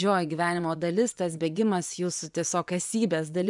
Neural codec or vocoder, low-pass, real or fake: vocoder, 24 kHz, 100 mel bands, Vocos; 10.8 kHz; fake